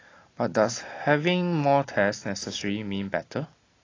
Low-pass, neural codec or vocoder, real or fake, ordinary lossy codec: 7.2 kHz; none; real; AAC, 32 kbps